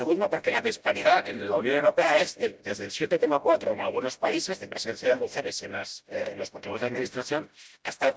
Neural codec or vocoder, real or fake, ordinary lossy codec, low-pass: codec, 16 kHz, 0.5 kbps, FreqCodec, smaller model; fake; none; none